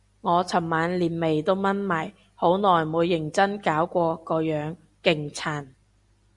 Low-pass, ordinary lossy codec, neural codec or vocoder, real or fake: 10.8 kHz; Opus, 64 kbps; none; real